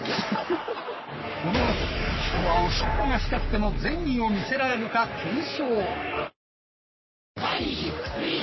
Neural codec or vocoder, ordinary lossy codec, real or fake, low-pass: codec, 44.1 kHz, 3.4 kbps, Pupu-Codec; MP3, 24 kbps; fake; 7.2 kHz